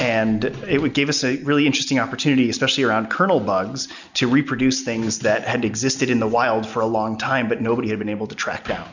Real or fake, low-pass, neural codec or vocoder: real; 7.2 kHz; none